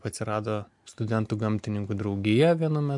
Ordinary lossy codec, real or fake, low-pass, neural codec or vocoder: MP3, 64 kbps; real; 10.8 kHz; none